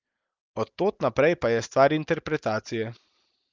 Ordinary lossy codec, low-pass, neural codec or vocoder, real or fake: Opus, 32 kbps; 7.2 kHz; none; real